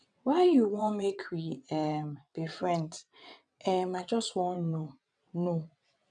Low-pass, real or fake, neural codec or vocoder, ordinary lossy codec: 9.9 kHz; fake; vocoder, 22.05 kHz, 80 mel bands, WaveNeXt; none